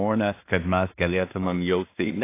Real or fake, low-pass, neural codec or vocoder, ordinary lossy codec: fake; 3.6 kHz; codec, 16 kHz in and 24 kHz out, 0.4 kbps, LongCat-Audio-Codec, two codebook decoder; AAC, 24 kbps